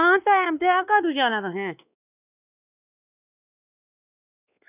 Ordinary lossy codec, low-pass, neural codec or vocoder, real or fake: none; 3.6 kHz; codec, 16 kHz, 2 kbps, X-Codec, WavLM features, trained on Multilingual LibriSpeech; fake